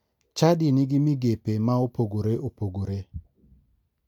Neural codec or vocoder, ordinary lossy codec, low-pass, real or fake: none; MP3, 96 kbps; 19.8 kHz; real